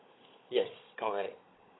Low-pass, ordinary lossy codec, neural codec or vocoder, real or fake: 7.2 kHz; AAC, 16 kbps; codec, 16 kHz, 16 kbps, FunCodec, trained on Chinese and English, 50 frames a second; fake